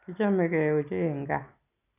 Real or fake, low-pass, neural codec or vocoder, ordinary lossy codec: real; 3.6 kHz; none; none